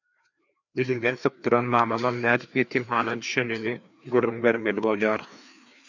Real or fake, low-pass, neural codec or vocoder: fake; 7.2 kHz; codec, 16 kHz, 2 kbps, FreqCodec, larger model